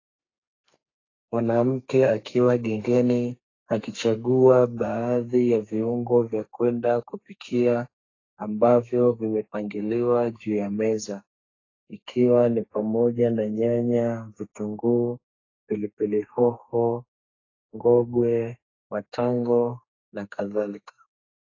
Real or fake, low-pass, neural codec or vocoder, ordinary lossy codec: fake; 7.2 kHz; codec, 32 kHz, 1.9 kbps, SNAC; AAC, 32 kbps